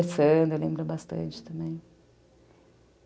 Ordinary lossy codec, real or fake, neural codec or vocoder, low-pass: none; real; none; none